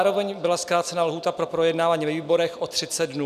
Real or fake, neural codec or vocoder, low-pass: real; none; 14.4 kHz